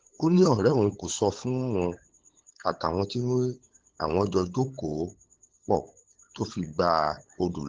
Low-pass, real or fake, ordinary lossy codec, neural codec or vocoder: 7.2 kHz; fake; Opus, 16 kbps; codec, 16 kHz, 8 kbps, FunCodec, trained on LibriTTS, 25 frames a second